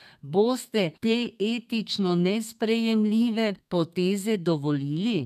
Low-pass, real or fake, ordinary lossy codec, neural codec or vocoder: 14.4 kHz; fake; none; codec, 32 kHz, 1.9 kbps, SNAC